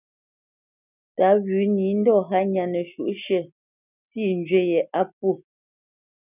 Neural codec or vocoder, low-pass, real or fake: none; 3.6 kHz; real